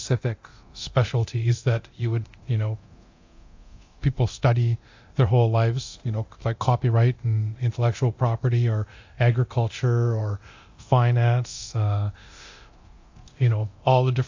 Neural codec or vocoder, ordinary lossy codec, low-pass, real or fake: codec, 24 kHz, 0.9 kbps, DualCodec; AAC, 48 kbps; 7.2 kHz; fake